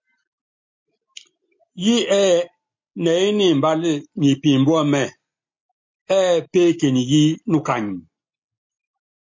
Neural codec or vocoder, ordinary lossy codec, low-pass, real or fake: none; MP3, 48 kbps; 7.2 kHz; real